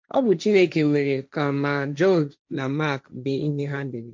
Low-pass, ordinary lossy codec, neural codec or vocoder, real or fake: none; none; codec, 16 kHz, 1.1 kbps, Voila-Tokenizer; fake